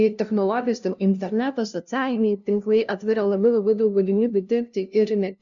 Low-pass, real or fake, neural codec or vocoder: 7.2 kHz; fake; codec, 16 kHz, 0.5 kbps, FunCodec, trained on LibriTTS, 25 frames a second